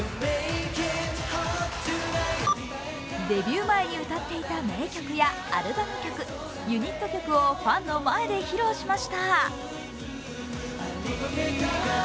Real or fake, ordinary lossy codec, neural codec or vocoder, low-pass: real; none; none; none